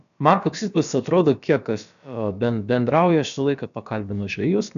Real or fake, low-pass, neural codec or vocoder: fake; 7.2 kHz; codec, 16 kHz, about 1 kbps, DyCAST, with the encoder's durations